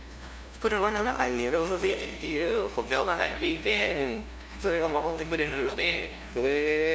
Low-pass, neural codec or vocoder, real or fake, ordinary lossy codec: none; codec, 16 kHz, 0.5 kbps, FunCodec, trained on LibriTTS, 25 frames a second; fake; none